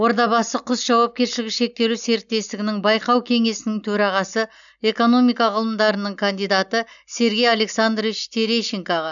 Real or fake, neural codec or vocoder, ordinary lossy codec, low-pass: real; none; none; 7.2 kHz